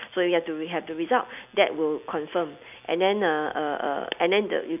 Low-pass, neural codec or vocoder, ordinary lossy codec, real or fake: 3.6 kHz; none; none; real